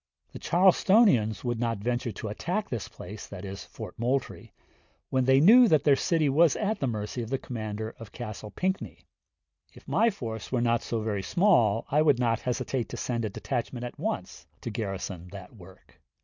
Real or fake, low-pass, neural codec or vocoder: real; 7.2 kHz; none